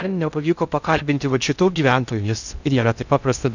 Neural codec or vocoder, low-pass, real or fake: codec, 16 kHz in and 24 kHz out, 0.6 kbps, FocalCodec, streaming, 2048 codes; 7.2 kHz; fake